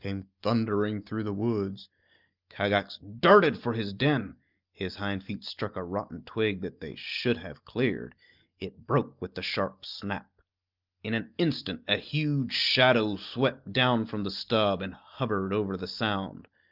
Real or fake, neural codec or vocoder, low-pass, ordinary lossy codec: real; none; 5.4 kHz; Opus, 32 kbps